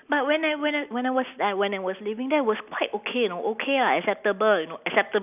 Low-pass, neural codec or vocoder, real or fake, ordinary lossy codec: 3.6 kHz; none; real; none